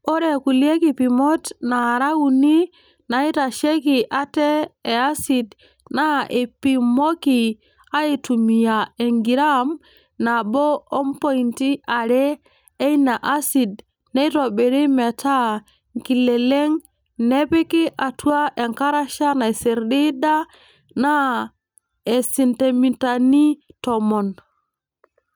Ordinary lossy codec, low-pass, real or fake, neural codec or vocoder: none; none; real; none